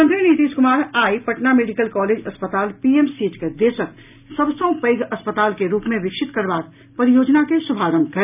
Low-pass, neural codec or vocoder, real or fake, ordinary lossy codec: 3.6 kHz; none; real; none